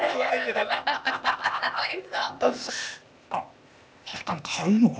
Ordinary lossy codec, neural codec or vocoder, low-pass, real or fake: none; codec, 16 kHz, 0.8 kbps, ZipCodec; none; fake